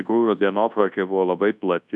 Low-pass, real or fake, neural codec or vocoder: 10.8 kHz; fake; codec, 24 kHz, 0.9 kbps, WavTokenizer, large speech release